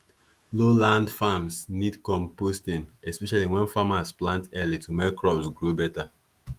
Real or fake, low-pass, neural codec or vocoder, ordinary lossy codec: fake; 14.4 kHz; autoencoder, 48 kHz, 128 numbers a frame, DAC-VAE, trained on Japanese speech; Opus, 32 kbps